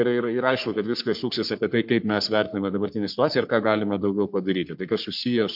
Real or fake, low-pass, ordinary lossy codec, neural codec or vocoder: fake; 5.4 kHz; MP3, 48 kbps; codec, 44.1 kHz, 3.4 kbps, Pupu-Codec